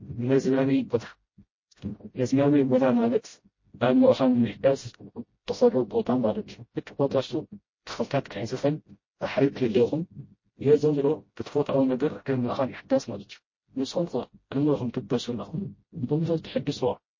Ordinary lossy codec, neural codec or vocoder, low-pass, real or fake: MP3, 32 kbps; codec, 16 kHz, 0.5 kbps, FreqCodec, smaller model; 7.2 kHz; fake